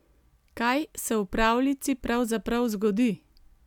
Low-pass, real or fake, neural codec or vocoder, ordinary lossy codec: 19.8 kHz; real; none; none